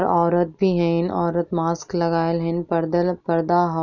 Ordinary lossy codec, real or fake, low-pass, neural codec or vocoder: none; real; 7.2 kHz; none